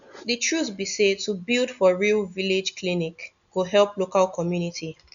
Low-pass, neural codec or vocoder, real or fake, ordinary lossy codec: 7.2 kHz; none; real; none